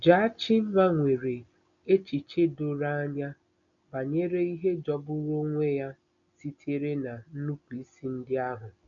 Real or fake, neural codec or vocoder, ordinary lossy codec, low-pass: real; none; none; 7.2 kHz